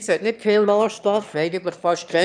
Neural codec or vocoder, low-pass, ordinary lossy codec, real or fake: autoencoder, 22.05 kHz, a latent of 192 numbers a frame, VITS, trained on one speaker; 9.9 kHz; none; fake